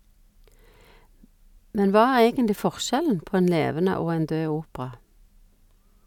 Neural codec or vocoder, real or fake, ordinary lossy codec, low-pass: none; real; none; 19.8 kHz